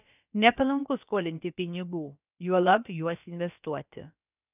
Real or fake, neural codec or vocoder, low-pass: fake; codec, 16 kHz, about 1 kbps, DyCAST, with the encoder's durations; 3.6 kHz